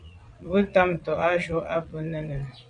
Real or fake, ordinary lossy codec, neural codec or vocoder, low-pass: fake; MP3, 96 kbps; vocoder, 22.05 kHz, 80 mel bands, Vocos; 9.9 kHz